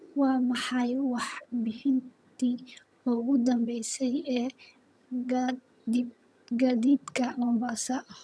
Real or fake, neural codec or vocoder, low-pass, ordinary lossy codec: fake; vocoder, 22.05 kHz, 80 mel bands, HiFi-GAN; none; none